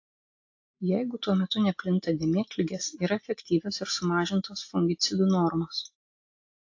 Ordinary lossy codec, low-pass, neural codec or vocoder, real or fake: AAC, 48 kbps; 7.2 kHz; none; real